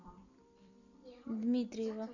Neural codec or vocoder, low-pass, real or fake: vocoder, 44.1 kHz, 128 mel bands every 256 samples, BigVGAN v2; 7.2 kHz; fake